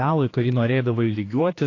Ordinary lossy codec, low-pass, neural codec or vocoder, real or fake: AAC, 32 kbps; 7.2 kHz; codec, 16 kHz, 2 kbps, X-Codec, HuBERT features, trained on general audio; fake